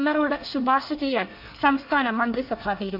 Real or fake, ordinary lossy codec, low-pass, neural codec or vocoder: fake; MP3, 48 kbps; 5.4 kHz; codec, 24 kHz, 1 kbps, SNAC